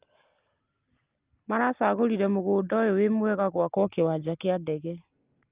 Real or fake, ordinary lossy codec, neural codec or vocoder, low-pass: real; Opus, 32 kbps; none; 3.6 kHz